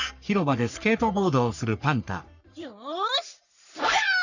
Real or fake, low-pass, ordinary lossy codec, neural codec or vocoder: fake; 7.2 kHz; none; codec, 44.1 kHz, 3.4 kbps, Pupu-Codec